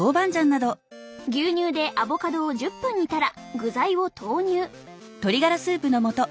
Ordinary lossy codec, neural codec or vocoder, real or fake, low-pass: none; none; real; none